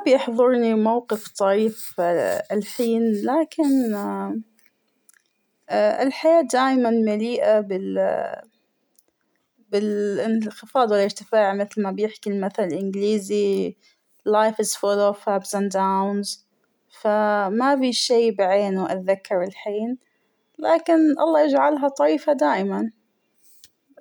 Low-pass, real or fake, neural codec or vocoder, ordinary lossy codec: none; real; none; none